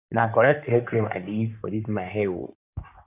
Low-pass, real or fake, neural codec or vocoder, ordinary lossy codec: 3.6 kHz; fake; codec, 16 kHz in and 24 kHz out, 2.2 kbps, FireRedTTS-2 codec; none